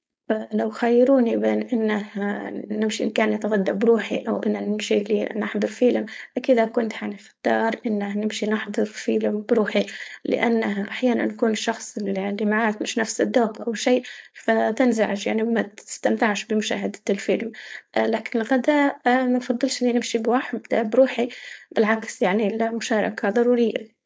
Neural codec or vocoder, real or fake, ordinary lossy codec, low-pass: codec, 16 kHz, 4.8 kbps, FACodec; fake; none; none